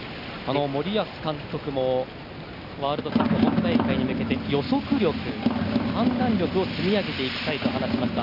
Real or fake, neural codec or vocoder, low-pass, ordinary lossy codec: real; none; 5.4 kHz; none